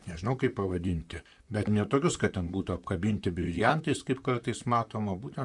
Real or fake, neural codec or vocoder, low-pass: fake; vocoder, 44.1 kHz, 128 mel bands, Pupu-Vocoder; 10.8 kHz